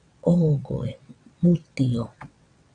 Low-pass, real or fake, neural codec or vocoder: 9.9 kHz; fake; vocoder, 22.05 kHz, 80 mel bands, WaveNeXt